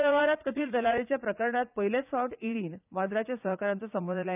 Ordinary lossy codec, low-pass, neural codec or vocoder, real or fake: none; 3.6 kHz; vocoder, 44.1 kHz, 80 mel bands, Vocos; fake